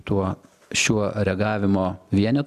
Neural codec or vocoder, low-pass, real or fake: none; 14.4 kHz; real